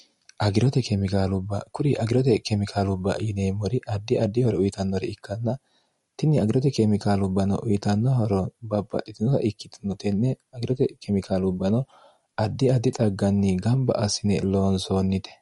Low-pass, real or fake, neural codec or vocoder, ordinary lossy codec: 14.4 kHz; real; none; MP3, 48 kbps